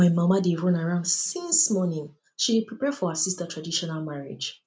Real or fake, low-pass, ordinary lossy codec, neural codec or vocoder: real; none; none; none